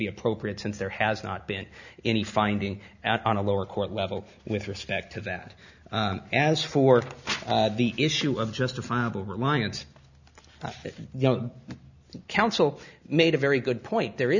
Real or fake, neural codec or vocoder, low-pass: real; none; 7.2 kHz